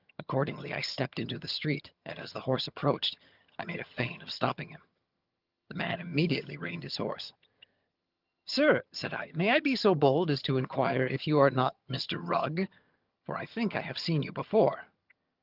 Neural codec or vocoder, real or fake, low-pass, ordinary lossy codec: vocoder, 22.05 kHz, 80 mel bands, HiFi-GAN; fake; 5.4 kHz; Opus, 24 kbps